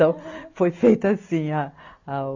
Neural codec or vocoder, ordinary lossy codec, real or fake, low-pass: none; AAC, 48 kbps; real; 7.2 kHz